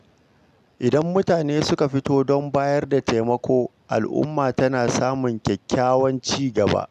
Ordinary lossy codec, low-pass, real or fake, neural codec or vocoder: none; 14.4 kHz; real; none